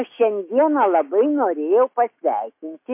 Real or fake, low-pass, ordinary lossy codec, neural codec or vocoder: fake; 3.6 kHz; MP3, 24 kbps; autoencoder, 48 kHz, 128 numbers a frame, DAC-VAE, trained on Japanese speech